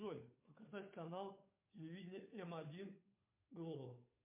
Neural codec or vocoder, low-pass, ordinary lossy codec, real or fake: codec, 16 kHz, 2 kbps, FunCodec, trained on Chinese and English, 25 frames a second; 3.6 kHz; MP3, 24 kbps; fake